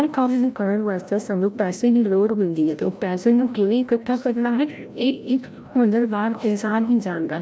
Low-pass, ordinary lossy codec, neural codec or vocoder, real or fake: none; none; codec, 16 kHz, 0.5 kbps, FreqCodec, larger model; fake